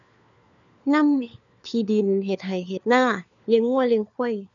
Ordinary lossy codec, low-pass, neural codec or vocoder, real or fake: none; 7.2 kHz; codec, 16 kHz, 4 kbps, FunCodec, trained on LibriTTS, 50 frames a second; fake